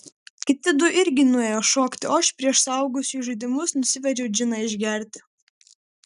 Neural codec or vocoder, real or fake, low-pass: none; real; 10.8 kHz